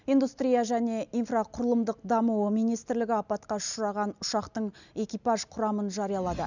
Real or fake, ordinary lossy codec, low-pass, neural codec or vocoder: real; none; 7.2 kHz; none